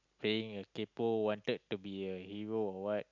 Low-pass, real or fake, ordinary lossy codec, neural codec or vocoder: 7.2 kHz; real; none; none